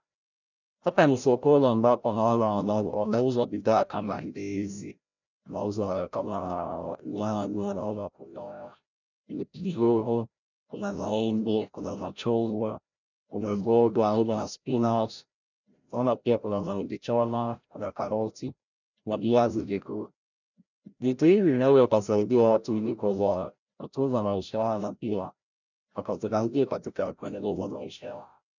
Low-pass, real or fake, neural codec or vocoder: 7.2 kHz; fake; codec, 16 kHz, 0.5 kbps, FreqCodec, larger model